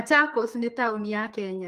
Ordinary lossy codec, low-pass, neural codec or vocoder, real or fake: Opus, 24 kbps; 14.4 kHz; codec, 32 kHz, 1.9 kbps, SNAC; fake